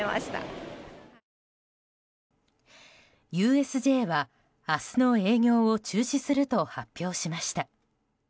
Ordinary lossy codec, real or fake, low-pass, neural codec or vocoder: none; real; none; none